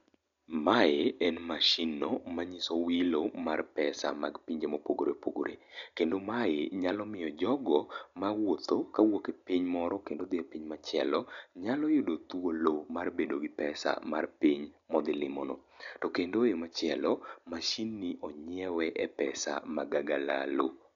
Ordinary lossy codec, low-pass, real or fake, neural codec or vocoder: none; 7.2 kHz; real; none